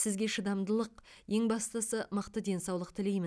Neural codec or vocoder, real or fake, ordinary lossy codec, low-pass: none; real; none; none